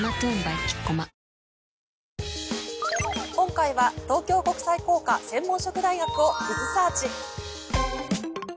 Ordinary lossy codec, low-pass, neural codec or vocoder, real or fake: none; none; none; real